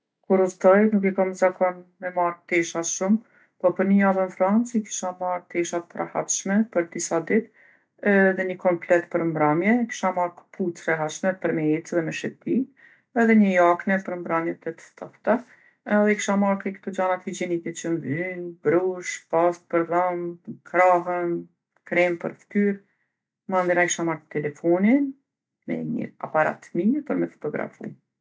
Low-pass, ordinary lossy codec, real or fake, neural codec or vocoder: none; none; real; none